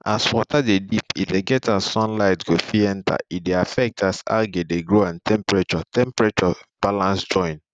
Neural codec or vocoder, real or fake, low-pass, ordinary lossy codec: none; real; 9.9 kHz; none